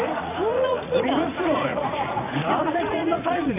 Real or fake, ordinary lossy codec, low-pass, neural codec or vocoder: fake; none; 3.6 kHz; vocoder, 44.1 kHz, 80 mel bands, Vocos